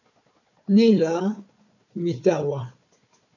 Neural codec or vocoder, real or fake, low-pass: codec, 16 kHz, 4 kbps, FunCodec, trained on Chinese and English, 50 frames a second; fake; 7.2 kHz